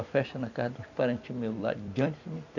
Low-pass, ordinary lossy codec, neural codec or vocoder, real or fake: 7.2 kHz; none; vocoder, 44.1 kHz, 128 mel bands every 256 samples, BigVGAN v2; fake